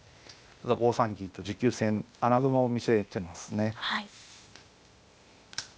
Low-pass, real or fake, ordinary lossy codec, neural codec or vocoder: none; fake; none; codec, 16 kHz, 0.8 kbps, ZipCodec